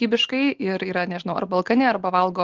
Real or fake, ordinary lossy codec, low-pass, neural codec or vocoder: real; Opus, 16 kbps; 7.2 kHz; none